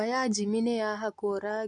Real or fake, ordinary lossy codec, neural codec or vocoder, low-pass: real; MP3, 48 kbps; none; 10.8 kHz